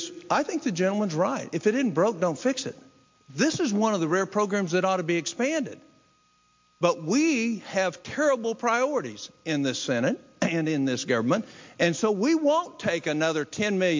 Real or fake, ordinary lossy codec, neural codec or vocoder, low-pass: real; MP3, 48 kbps; none; 7.2 kHz